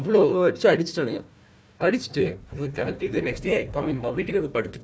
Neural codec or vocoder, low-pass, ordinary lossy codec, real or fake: codec, 16 kHz, 1 kbps, FunCodec, trained on Chinese and English, 50 frames a second; none; none; fake